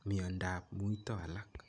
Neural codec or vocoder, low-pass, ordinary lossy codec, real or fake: none; 9.9 kHz; none; real